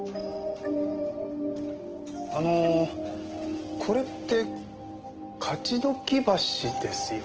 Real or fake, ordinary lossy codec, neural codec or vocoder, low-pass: real; Opus, 16 kbps; none; 7.2 kHz